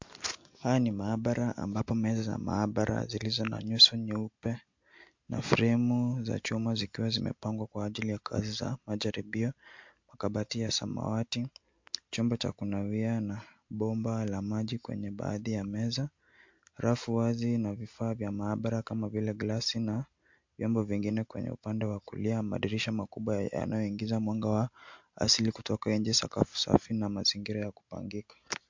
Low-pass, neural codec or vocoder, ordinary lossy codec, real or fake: 7.2 kHz; none; MP3, 48 kbps; real